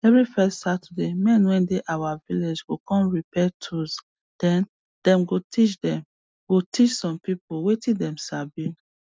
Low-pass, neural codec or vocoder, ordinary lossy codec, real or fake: none; none; none; real